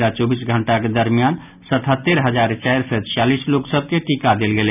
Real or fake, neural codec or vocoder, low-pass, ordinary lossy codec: real; none; 3.6 kHz; none